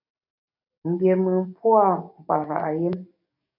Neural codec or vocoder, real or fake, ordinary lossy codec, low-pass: codec, 44.1 kHz, 7.8 kbps, DAC; fake; MP3, 32 kbps; 5.4 kHz